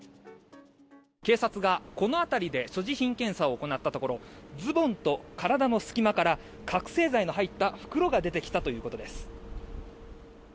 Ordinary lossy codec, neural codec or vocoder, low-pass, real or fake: none; none; none; real